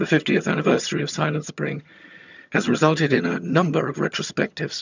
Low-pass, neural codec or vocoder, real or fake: 7.2 kHz; vocoder, 22.05 kHz, 80 mel bands, HiFi-GAN; fake